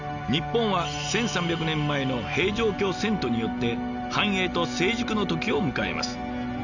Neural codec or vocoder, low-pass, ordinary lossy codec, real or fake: none; 7.2 kHz; none; real